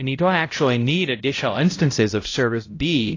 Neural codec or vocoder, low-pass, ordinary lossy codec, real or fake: codec, 16 kHz, 0.5 kbps, X-Codec, HuBERT features, trained on balanced general audio; 7.2 kHz; AAC, 32 kbps; fake